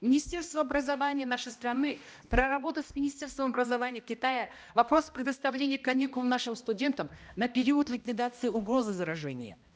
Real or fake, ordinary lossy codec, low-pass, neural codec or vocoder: fake; none; none; codec, 16 kHz, 1 kbps, X-Codec, HuBERT features, trained on balanced general audio